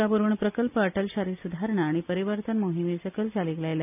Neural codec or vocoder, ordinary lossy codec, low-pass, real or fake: none; none; 3.6 kHz; real